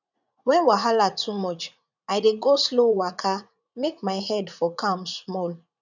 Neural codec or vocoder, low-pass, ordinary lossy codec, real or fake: vocoder, 44.1 kHz, 80 mel bands, Vocos; 7.2 kHz; none; fake